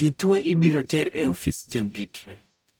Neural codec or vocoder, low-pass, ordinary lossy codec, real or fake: codec, 44.1 kHz, 0.9 kbps, DAC; none; none; fake